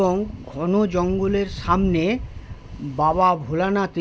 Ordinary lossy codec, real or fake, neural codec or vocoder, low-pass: none; real; none; none